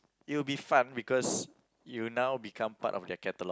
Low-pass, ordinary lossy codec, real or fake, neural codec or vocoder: none; none; real; none